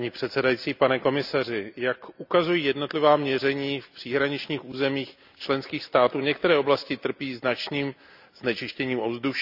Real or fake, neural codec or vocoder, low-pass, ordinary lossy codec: real; none; 5.4 kHz; none